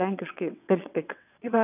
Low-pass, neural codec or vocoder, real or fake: 3.6 kHz; vocoder, 22.05 kHz, 80 mel bands, WaveNeXt; fake